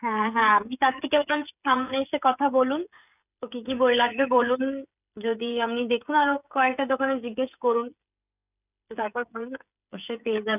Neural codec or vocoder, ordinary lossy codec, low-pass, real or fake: codec, 16 kHz, 16 kbps, FreqCodec, smaller model; none; 3.6 kHz; fake